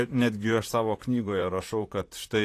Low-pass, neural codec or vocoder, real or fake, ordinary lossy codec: 14.4 kHz; vocoder, 44.1 kHz, 128 mel bands, Pupu-Vocoder; fake; AAC, 64 kbps